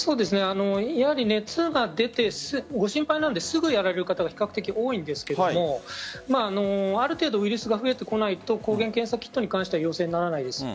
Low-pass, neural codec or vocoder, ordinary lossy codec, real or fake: none; none; none; real